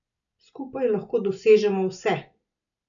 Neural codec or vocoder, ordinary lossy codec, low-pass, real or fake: none; none; 7.2 kHz; real